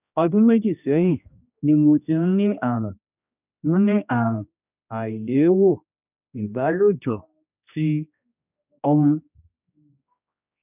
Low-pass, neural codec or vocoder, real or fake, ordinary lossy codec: 3.6 kHz; codec, 16 kHz, 1 kbps, X-Codec, HuBERT features, trained on general audio; fake; none